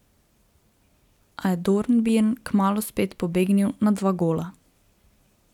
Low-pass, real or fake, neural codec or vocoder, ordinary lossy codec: 19.8 kHz; real; none; none